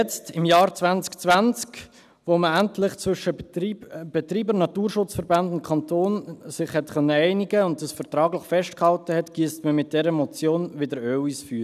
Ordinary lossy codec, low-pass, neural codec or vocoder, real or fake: none; 14.4 kHz; none; real